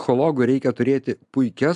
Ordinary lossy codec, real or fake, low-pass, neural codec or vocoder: AAC, 96 kbps; real; 10.8 kHz; none